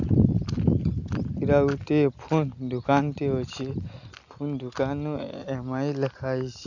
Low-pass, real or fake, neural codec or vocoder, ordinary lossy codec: 7.2 kHz; real; none; none